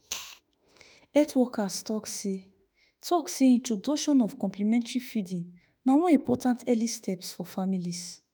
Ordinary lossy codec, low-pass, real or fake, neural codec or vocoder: none; none; fake; autoencoder, 48 kHz, 32 numbers a frame, DAC-VAE, trained on Japanese speech